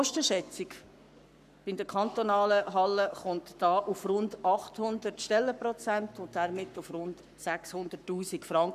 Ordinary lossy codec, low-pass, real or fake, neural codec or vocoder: none; 14.4 kHz; fake; codec, 44.1 kHz, 7.8 kbps, Pupu-Codec